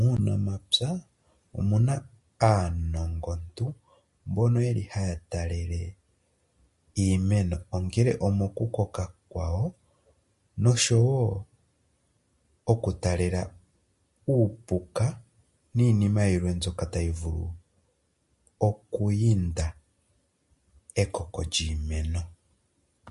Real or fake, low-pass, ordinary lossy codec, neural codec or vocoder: real; 14.4 kHz; MP3, 48 kbps; none